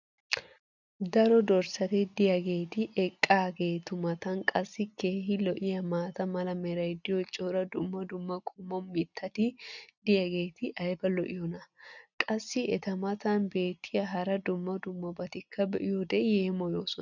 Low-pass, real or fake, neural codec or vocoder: 7.2 kHz; real; none